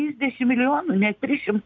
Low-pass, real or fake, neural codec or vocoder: 7.2 kHz; real; none